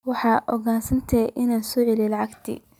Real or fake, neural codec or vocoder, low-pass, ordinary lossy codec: real; none; 19.8 kHz; none